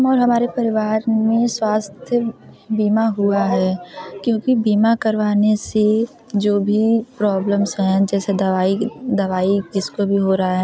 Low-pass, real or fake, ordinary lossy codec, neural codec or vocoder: none; real; none; none